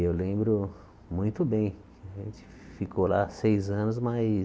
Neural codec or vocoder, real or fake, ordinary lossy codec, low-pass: none; real; none; none